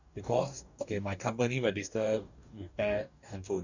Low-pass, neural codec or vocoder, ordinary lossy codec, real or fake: 7.2 kHz; codec, 44.1 kHz, 2.6 kbps, DAC; none; fake